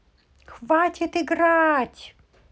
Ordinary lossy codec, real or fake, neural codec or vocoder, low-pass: none; real; none; none